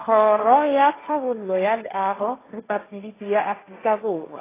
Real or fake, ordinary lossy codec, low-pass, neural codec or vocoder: fake; AAC, 16 kbps; 3.6 kHz; codec, 16 kHz, 1.1 kbps, Voila-Tokenizer